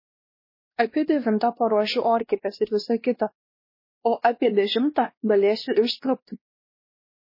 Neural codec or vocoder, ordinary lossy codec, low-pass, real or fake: codec, 16 kHz, 1 kbps, X-Codec, WavLM features, trained on Multilingual LibriSpeech; MP3, 24 kbps; 5.4 kHz; fake